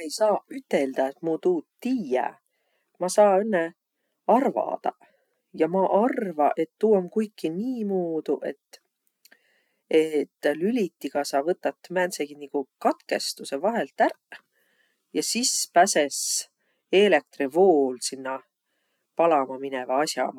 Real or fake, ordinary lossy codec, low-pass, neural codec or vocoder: real; none; 19.8 kHz; none